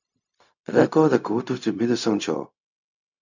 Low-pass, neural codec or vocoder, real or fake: 7.2 kHz; codec, 16 kHz, 0.4 kbps, LongCat-Audio-Codec; fake